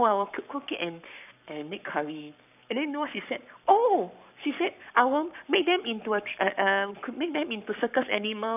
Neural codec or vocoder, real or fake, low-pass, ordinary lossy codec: codec, 44.1 kHz, 7.8 kbps, Pupu-Codec; fake; 3.6 kHz; none